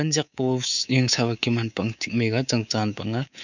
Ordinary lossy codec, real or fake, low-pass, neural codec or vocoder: none; fake; 7.2 kHz; codec, 24 kHz, 3.1 kbps, DualCodec